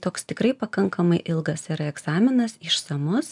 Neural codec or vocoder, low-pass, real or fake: none; 10.8 kHz; real